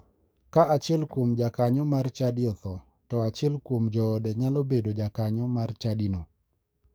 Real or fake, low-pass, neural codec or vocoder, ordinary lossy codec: fake; none; codec, 44.1 kHz, 7.8 kbps, DAC; none